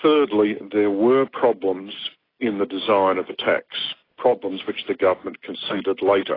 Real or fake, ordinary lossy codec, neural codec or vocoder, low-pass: real; AAC, 24 kbps; none; 5.4 kHz